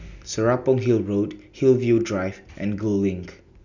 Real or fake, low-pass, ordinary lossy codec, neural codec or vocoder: real; 7.2 kHz; none; none